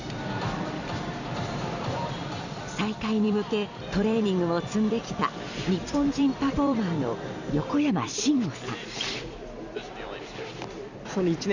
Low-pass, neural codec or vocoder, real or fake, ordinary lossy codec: 7.2 kHz; vocoder, 44.1 kHz, 128 mel bands every 256 samples, BigVGAN v2; fake; Opus, 64 kbps